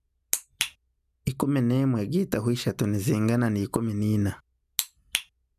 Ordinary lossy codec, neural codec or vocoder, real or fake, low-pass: none; none; real; 14.4 kHz